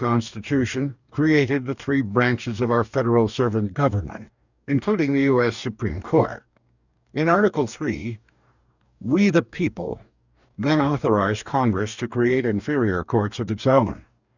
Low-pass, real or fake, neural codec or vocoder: 7.2 kHz; fake; codec, 44.1 kHz, 2.6 kbps, DAC